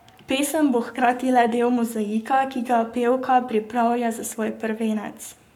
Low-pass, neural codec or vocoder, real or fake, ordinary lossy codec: 19.8 kHz; codec, 44.1 kHz, 7.8 kbps, Pupu-Codec; fake; none